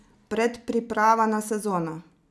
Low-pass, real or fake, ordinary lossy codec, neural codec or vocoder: none; real; none; none